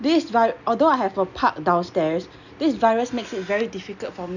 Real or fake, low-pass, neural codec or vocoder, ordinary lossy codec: real; 7.2 kHz; none; none